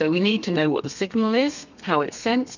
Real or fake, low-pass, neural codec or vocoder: fake; 7.2 kHz; codec, 32 kHz, 1.9 kbps, SNAC